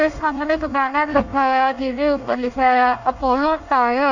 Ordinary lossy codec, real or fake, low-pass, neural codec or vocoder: none; fake; 7.2 kHz; codec, 24 kHz, 1 kbps, SNAC